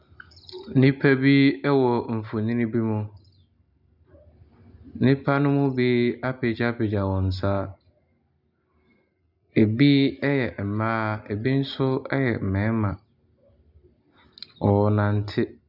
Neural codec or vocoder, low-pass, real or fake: none; 5.4 kHz; real